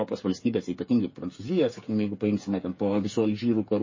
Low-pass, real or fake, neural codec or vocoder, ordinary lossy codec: 7.2 kHz; fake; codec, 16 kHz, 8 kbps, FreqCodec, smaller model; MP3, 32 kbps